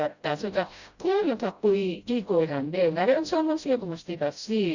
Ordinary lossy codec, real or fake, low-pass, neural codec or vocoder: Opus, 64 kbps; fake; 7.2 kHz; codec, 16 kHz, 0.5 kbps, FreqCodec, smaller model